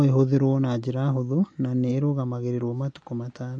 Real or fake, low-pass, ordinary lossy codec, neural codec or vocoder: real; 9.9 kHz; MP3, 48 kbps; none